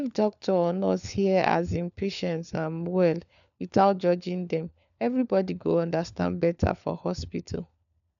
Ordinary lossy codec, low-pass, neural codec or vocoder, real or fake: none; 7.2 kHz; codec, 16 kHz, 4 kbps, FunCodec, trained on LibriTTS, 50 frames a second; fake